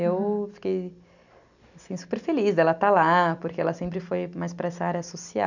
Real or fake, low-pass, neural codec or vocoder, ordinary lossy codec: real; 7.2 kHz; none; none